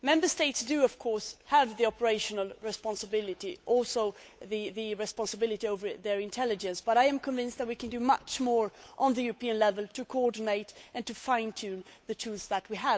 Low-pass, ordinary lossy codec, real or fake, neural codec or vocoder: none; none; fake; codec, 16 kHz, 8 kbps, FunCodec, trained on Chinese and English, 25 frames a second